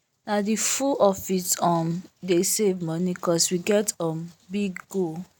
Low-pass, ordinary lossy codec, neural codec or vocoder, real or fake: none; none; none; real